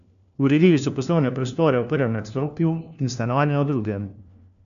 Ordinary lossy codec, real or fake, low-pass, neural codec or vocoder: none; fake; 7.2 kHz; codec, 16 kHz, 1 kbps, FunCodec, trained on LibriTTS, 50 frames a second